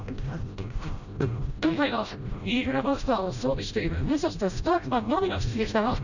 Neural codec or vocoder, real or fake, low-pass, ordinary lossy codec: codec, 16 kHz, 0.5 kbps, FreqCodec, smaller model; fake; 7.2 kHz; none